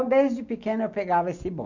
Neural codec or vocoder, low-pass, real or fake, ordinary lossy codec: none; 7.2 kHz; real; none